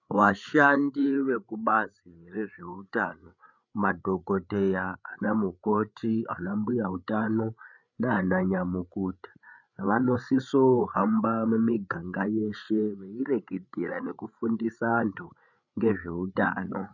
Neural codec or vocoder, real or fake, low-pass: codec, 16 kHz, 8 kbps, FreqCodec, larger model; fake; 7.2 kHz